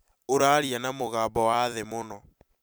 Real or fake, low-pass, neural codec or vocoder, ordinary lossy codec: fake; none; vocoder, 44.1 kHz, 128 mel bands every 256 samples, BigVGAN v2; none